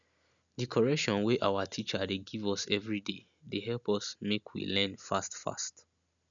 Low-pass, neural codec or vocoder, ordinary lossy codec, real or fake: 7.2 kHz; none; none; real